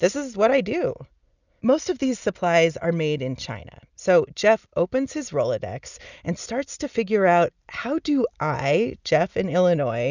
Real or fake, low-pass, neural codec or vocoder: real; 7.2 kHz; none